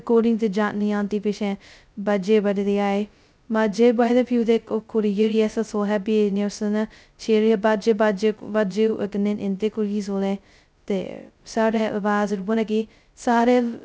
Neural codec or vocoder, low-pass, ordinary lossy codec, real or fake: codec, 16 kHz, 0.2 kbps, FocalCodec; none; none; fake